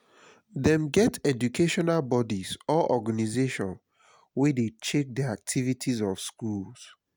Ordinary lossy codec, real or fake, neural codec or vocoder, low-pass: none; real; none; none